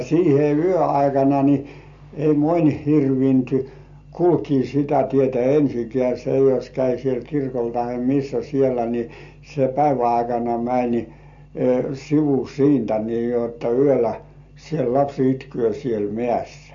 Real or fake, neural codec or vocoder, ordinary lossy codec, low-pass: real; none; MP3, 48 kbps; 7.2 kHz